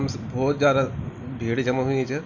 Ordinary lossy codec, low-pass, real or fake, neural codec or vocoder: none; 7.2 kHz; real; none